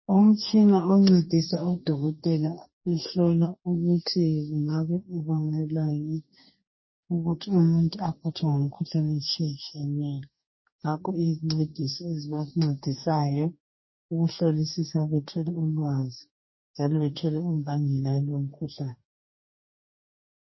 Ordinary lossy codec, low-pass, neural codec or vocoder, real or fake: MP3, 24 kbps; 7.2 kHz; codec, 44.1 kHz, 2.6 kbps, DAC; fake